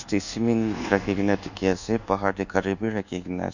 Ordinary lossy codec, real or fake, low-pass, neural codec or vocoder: none; fake; 7.2 kHz; codec, 24 kHz, 1.2 kbps, DualCodec